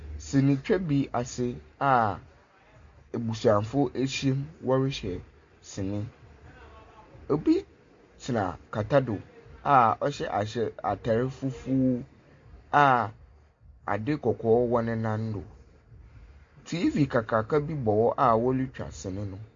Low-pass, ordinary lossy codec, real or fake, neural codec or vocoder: 7.2 kHz; MP3, 48 kbps; real; none